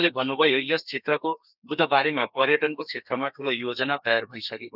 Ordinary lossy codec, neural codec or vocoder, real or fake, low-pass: none; codec, 32 kHz, 1.9 kbps, SNAC; fake; 5.4 kHz